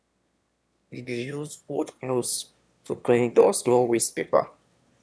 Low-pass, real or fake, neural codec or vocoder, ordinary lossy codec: none; fake; autoencoder, 22.05 kHz, a latent of 192 numbers a frame, VITS, trained on one speaker; none